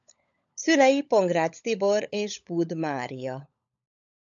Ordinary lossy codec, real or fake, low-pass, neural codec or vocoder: MP3, 96 kbps; fake; 7.2 kHz; codec, 16 kHz, 16 kbps, FunCodec, trained on LibriTTS, 50 frames a second